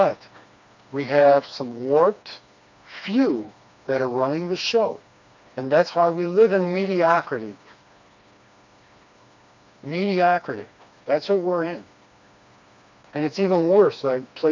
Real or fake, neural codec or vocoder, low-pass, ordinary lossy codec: fake; codec, 16 kHz, 2 kbps, FreqCodec, smaller model; 7.2 kHz; MP3, 64 kbps